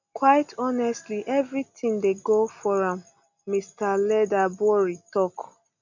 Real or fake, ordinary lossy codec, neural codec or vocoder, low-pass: real; none; none; 7.2 kHz